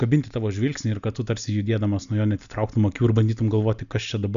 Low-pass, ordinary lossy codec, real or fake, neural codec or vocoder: 7.2 kHz; MP3, 96 kbps; real; none